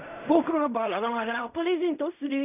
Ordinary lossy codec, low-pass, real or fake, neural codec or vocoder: none; 3.6 kHz; fake; codec, 16 kHz in and 24 kHz out, 0.4 kbps, LongCat-Audio-Codec, fine tuned four codebook decoder